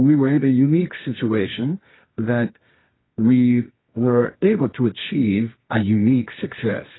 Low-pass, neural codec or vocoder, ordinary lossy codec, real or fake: 7.2 kHz; codec, 24 kHz, 0.9 kbps, WavTokenizer, medium music audio release; AAC, 16 kbps; fake